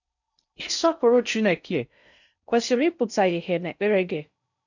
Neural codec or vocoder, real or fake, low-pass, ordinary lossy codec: codec, 16 kHz in and 24 kHz out, 0.6 kbps, FocalCodec, streaming, 4096 codes; fake; 7.2 kHz; none